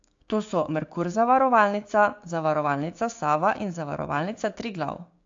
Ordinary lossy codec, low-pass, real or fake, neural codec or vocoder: AAC, 48 kbps; 7.2 kHz; fake; codec, 16 kHz, 6 kbps, DAC